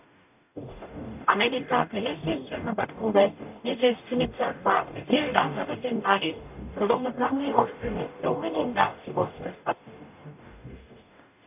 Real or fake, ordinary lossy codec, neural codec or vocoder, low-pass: fake; none; codec, 44.1 kHz, 0.9 kbps, DAC; 3.6 kHz